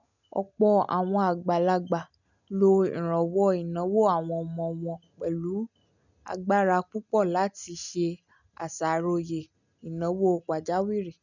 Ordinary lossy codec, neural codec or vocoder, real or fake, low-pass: none; none; real; 7.2 kHz